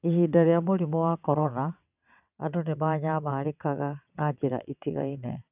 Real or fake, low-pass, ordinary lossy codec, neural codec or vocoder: fake; 3.6 kHz; none; vocoder, 22.05 kHz, 80 mel bands, WaveNeXt